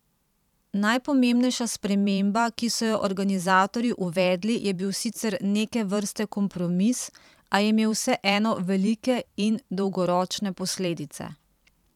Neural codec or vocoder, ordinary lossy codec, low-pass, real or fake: vocoder, 44.1 kHz, 128 mel bands every 512 samples, BigVGAN v2; none; 19.8 kHz; fake